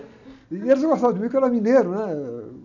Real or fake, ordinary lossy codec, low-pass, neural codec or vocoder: real; none; 7.2 kHz; none